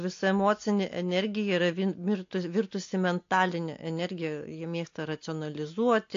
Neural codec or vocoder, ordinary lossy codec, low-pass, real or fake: none; AAC, 48 kbps; 7.2 kHz; real